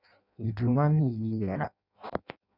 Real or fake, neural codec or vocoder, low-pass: fake; codec, 16 kHz in and 24 kHz out, 0.6 kbps, FireRedTTS-2 codec; 5.4 kHz